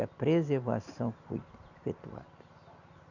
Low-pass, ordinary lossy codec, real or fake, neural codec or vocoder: 7.2 kHz; none; real; none